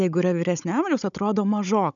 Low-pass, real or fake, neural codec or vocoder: 7.2 kHz; fake; codec, 16 kHz, 16 kbps, FunCodec, trained on Chinese and English, 50 frames a second